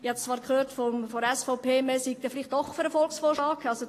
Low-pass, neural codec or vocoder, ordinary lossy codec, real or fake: 14.4 kHz; none; AAC, 48 kbps; real